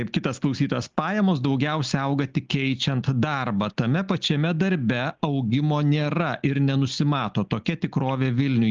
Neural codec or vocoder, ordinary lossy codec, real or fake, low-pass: none; Opus, 24 kbps; real; 7.2 kHz